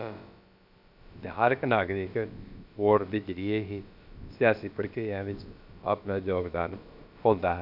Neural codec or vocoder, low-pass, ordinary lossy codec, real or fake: codec, 16 kHz, about 1 kbps, DyCAST, with the encoder's durations; 5.4 kHz; none; fake